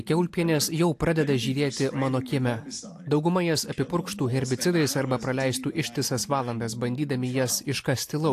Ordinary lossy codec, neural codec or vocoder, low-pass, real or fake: AAC, 64 kbps; none; 14.4 kHz; real